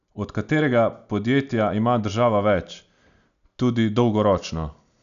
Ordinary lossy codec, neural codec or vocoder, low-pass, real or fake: none; none; 7.2 kHz; real